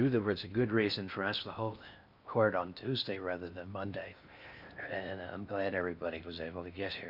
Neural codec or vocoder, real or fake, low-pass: codec, 16 kHz in and 24 kHz out, 0.6 kbps, FocalCodec, streaming, 4096 codes; fake; 5.4 kHz